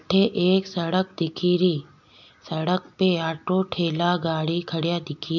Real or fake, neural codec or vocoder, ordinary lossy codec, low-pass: real; none; MP3, 64 kbps; 7.2 kHz